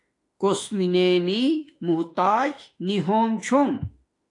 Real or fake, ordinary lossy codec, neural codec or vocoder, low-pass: fake; AAC, 48 kbps; autoencoder, 48 kHz, 32 numbers a frame, DAC-VAE, trained on Japanese speech; 10.8 kHz